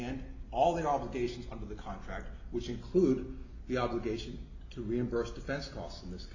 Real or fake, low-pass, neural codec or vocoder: real; 7.2 kHz; none